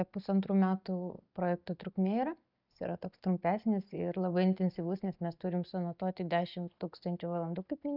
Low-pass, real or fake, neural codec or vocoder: 5.4 kHz; real; none